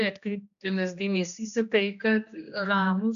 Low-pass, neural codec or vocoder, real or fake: 7.2 kHz; codec, 16 kHz, 1 kbps, X-Codec, HuBERT features, trained on general audio; fake